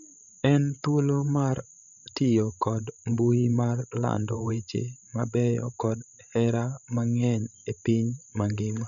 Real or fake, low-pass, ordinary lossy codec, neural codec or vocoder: fake; 7.2 kHz; MP3, 96 kbps; codec, 16 kHz, 16 kbps, FreqCodec, larger model